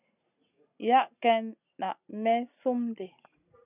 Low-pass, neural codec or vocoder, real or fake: 3.6 kHz; none; real